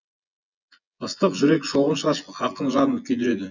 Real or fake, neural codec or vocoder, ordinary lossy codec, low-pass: fake; vocoder, 24 kHz, 100 mel bands, Vocos; none; 7.2 kHz